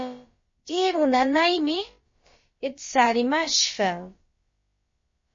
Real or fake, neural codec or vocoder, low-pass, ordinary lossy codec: fake; codec, 16 kHz, about 1 kbps, DyCAST, with the encoder's durations; 7.2 kHz; MP3, 32 kbps